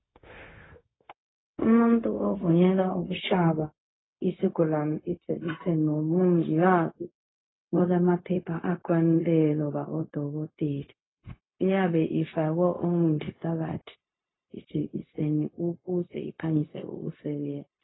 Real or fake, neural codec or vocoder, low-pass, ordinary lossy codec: fake; codec, 16 kHz, 0.4 kbps, LongCat-Audio-Codec; 7.2 kHz; AAC, 16 kbps